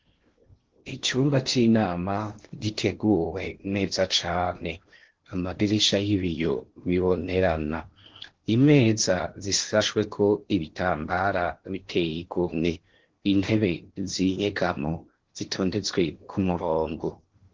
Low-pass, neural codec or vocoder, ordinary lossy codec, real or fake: 7.2 kHz; codec, 16 kHz in and 24 kHz out, 0.8 kbps, FocalCodec, streaming, 65536 codes; Opus, 16 kbps; fake